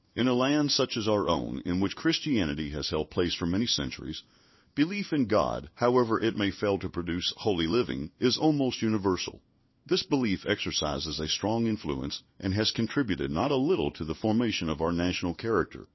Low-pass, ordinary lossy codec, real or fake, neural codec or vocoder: 7.2 kHz; MP3, 24 kbps; fake; codec, 16 kHz in and 24 kHz out, 1 kbps, XY-Tokenizer